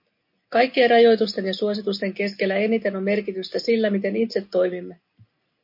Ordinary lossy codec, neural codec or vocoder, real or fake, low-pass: MP3, 32 kbps; none; real; 5.4 kHz